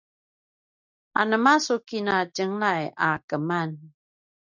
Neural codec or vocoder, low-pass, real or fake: none; 7.2 kHz; real